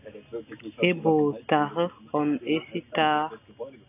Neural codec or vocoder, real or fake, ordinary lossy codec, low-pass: none; real; AAC, 24 kbps; 3.6 kHz